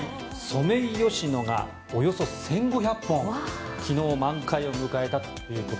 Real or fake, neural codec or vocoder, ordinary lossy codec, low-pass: real; none; none; none